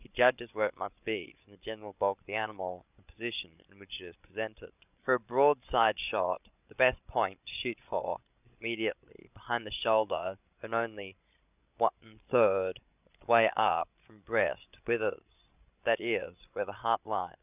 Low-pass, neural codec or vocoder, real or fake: 3.6 kHz; codec, 16 kHz, 16 kbps, FreqCodec, larger model; fake